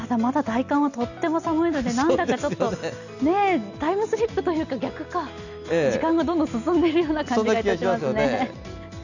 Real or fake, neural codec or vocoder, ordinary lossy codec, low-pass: real; none; none; 7.2 kHz